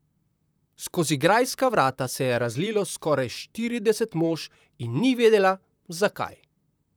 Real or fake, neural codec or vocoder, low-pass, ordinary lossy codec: fake; vocoder, 44.1 kHz, 128 mel bands, Pupu-Vocoder; none; none